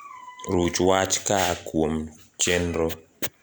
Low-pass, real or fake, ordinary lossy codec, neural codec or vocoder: none; real; none; none